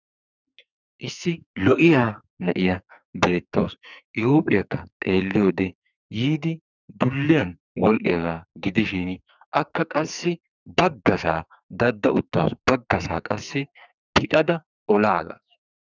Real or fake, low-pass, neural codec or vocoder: fake; 7.2 kHz; codec, 44.1 kHz, 2.6 kbps, SNAC